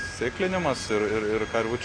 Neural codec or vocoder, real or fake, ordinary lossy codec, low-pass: none; real; AAC, 32 kbps; 9.9 kHz